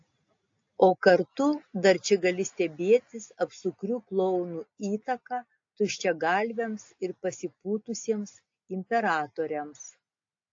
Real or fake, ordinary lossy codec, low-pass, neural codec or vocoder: real; AAC, 48 kbps; 7.2 kHz; none